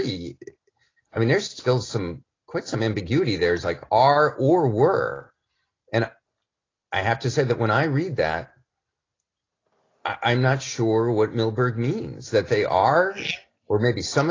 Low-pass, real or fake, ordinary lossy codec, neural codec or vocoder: 7.2 kHz; real; AAC, 32 kbps; none